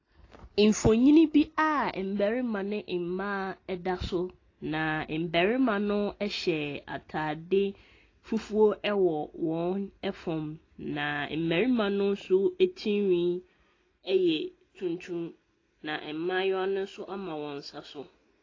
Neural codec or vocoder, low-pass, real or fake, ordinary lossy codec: none; 7.2 kHz; real; AAC, 32 kbps